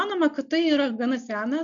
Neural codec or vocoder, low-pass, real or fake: none; 7.2 kHz; real